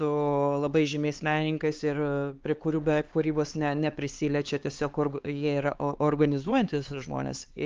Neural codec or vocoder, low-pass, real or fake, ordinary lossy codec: codec, 16 kHz, 4 kbps, X-Codec, HuBERT features, trained on LibriSpeech; 7.2 kHz; fake; Opus, 32 kbps